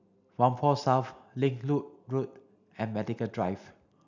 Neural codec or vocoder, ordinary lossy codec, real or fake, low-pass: none; none; real; 7.2 kHz